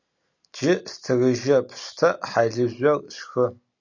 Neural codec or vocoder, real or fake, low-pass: none; real; 7.2 kHz